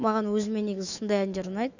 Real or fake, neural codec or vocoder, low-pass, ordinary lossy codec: fake; autoencoder, 48 kHz, 128 numbers a frame, DAC-VAE, trained on Japanese speech; 7.2 kHz; none